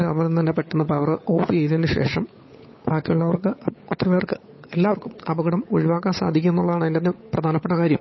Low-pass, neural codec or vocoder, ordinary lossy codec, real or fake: 7.2 kHz; codec, 16 kHz, 8 kbps, FunCodec, trained on Chinese and English, 25 frames a second; MP3, 24 kbps; fake